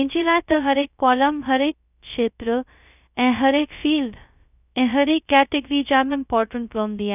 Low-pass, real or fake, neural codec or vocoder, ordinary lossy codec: 3.6 kHz; fake; codec, 16 kHz, 0.2 kbps, FocalCodec; none